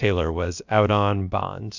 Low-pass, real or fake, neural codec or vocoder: 7.2 kHz; fake; codec, 16 kHz, about 1 kbps, DyCAST, with the encoder's durations